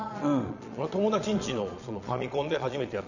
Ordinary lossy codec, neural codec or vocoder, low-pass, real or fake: none; vocoder, 22.05 kHz, 80 mel bands, Vocos; 7.2 kHz; fake